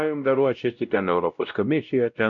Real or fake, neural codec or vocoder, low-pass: fake; codec, 16 kHz, 0.5 kbps, X-Codec, WavLM features, trained on Multilingual LibriSpeech; 7.2 kHz